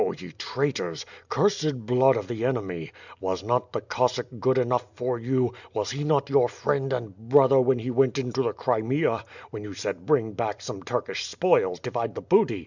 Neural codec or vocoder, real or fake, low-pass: none; real; 7.2 kHz